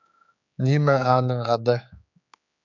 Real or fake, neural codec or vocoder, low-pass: fake; codec, 16 kHz, 4 kbps, X-Codec, HuBERT features, trained on general audio; 7.2 kHz